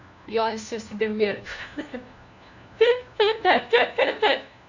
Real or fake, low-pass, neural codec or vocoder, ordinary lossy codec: fake; 7.2 kHz; codec, 16 kHz, 1 kbps, FunCodec, trained on LibriTTS, 50 frames a second; none